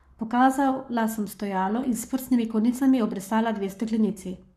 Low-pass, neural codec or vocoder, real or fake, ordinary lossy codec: 14.4 kHz; codec, 44.1 kHz, 7.8 kbps, Pupu-Codec; fake; none